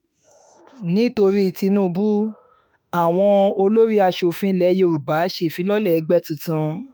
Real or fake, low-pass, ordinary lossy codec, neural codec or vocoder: fake; none; none; autoencoder, 48 kHz, 32 numbers a frame, DAC-VAE, trained on Japanese speech